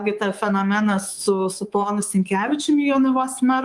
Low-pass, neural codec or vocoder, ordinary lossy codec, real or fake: 10.8 kHz; codec, 24 kHz, 3.1 kbps, DualCodec; Opus, 24 kbps; fake